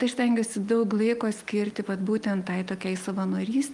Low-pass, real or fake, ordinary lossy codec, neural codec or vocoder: 10.8 kHz; real; Opus, 32 kbps; none